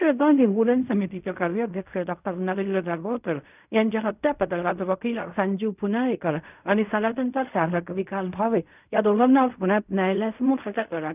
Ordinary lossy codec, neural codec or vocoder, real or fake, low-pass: none; codec, 16 kHz in and 24 kHz out, 0.4 kbps, LongCat-Audio-Codec, fine tuned four codebook decoder; fake; 3.6 kHz